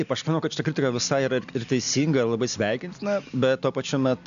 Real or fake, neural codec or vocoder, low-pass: real; none; 7.2 kHz